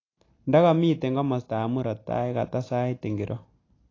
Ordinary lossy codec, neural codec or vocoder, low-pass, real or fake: AAC, 32 kbps; none; 7.2 kHz; real